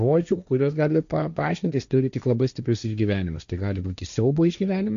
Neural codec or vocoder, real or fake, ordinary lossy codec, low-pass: codec, 16 kHz, 1.1 kbps, Voila-Tokenizer; fake; AAC, 96 kbps; 7.2 kHz